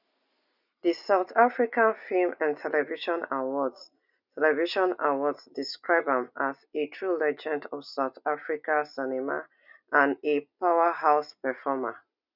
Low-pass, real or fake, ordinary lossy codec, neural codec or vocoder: 5.4 kHz; real; none; none